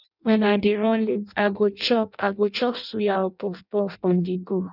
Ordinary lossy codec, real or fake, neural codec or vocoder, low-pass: none; fake; codec, 16 kHz in and 24 kHz out, 0.6 kbps, FireRedTTS-2 codec; 5.4 kHz